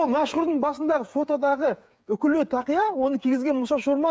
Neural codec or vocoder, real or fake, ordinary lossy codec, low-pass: codec, 16 kHz, 8 kbps, FreqCodec, smaller model; fake; none; none